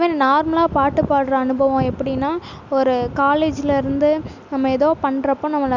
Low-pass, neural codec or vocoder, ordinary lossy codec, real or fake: 7.2 kHz; none; none; real